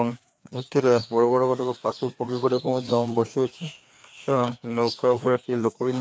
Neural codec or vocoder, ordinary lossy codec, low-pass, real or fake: codec, 16 kHz, 2 kbps, FreqCodec, larger model; none; none; fake